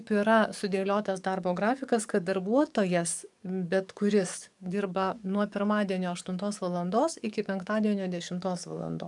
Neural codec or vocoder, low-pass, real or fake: codec, 44.1 kHz, 7.8 kbps, DAC; 10.8 kHz; fake